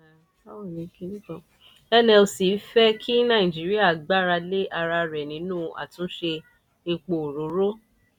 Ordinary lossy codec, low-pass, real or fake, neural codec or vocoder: none; 19.8 kHz; real; none